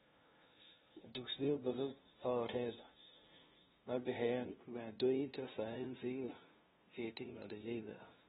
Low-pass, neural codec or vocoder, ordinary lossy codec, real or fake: 7.2 kHz; codec, 16 kHz, 0.5 kbps, FunCodec, trained on LibriTTS, 25 frames a second; AAC, 16 kbps; fake